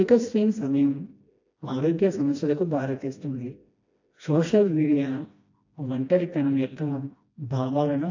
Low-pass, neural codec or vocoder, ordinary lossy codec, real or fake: 7.2 kHz; codec, 16 kHz, 1 kbps, FreqCodec, smaller model; AAC, 48 kbps; fake